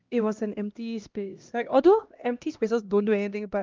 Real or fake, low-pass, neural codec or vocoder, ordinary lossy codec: fake; 7.2 kHz; codec, 16 kHz, 1 kbps, X-Codec, HuBERT features, trained on LibriSpeech; Opus, 24 kbps